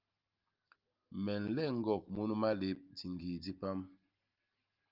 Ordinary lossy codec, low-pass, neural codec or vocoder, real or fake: Opus, 24 kbps; 5.4 kHz; none; real